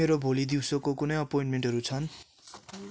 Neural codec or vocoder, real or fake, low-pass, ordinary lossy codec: none; real; none; none